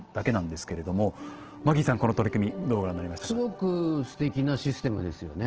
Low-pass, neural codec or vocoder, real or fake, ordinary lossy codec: 7.2 kHz; none; real; Opus, 16 kbps